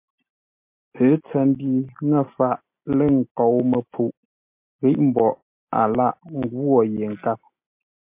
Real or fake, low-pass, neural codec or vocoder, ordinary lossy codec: real; 3.6 kHz; none; MP3, 32 kbps